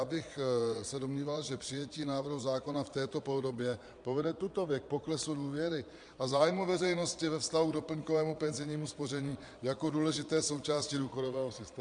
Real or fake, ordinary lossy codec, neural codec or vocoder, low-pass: fake; MP3, 64 kbps; vocoder, 22.05 kHz, 80 mel bands, Vocos; 9.9 kHz